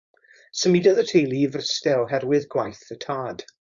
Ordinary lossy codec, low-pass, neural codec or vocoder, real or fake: Opus, 64 kbps; 7.2 kHz; codec, 16 kHz, 4.8 kbps, FACodec; fake